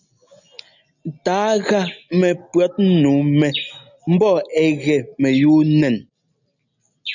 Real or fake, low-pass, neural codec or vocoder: real; 7.2 kHz; none